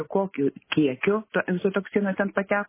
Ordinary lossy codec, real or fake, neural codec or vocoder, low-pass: MP3, 16 kbps; real; none; 3.6 kHz